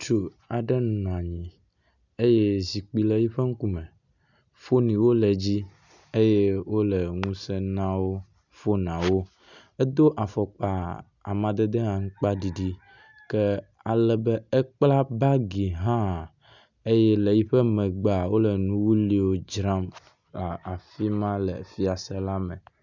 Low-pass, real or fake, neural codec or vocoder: 7.2 kHz; real; none